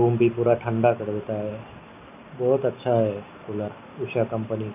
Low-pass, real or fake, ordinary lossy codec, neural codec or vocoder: 3.6 kHz; real; none; none